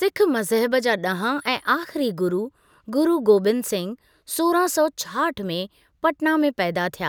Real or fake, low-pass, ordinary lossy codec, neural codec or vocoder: real; none; none; none